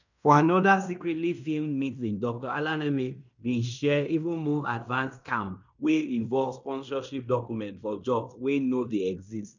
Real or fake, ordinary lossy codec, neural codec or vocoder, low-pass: fake; none; codec, 16 kHz in and 24 kHz out, 0.9 kbps, LongCat-Audio-Codec, fine tuned four codebook decoder; 7.2 kHz